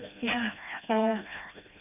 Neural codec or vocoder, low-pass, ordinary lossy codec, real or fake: codec, 16 kHz, 1 kbps, FreqCodec, smaller model; 3.6 kHz; none; fake